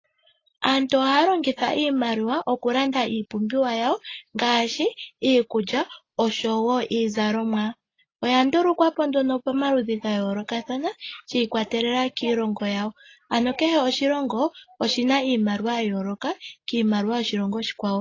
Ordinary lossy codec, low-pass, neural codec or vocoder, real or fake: AAC, 32 kbps; 7.2 kHz; none; real